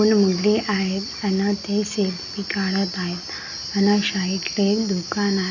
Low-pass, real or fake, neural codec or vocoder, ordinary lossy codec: 7.2 kHz; real; none; none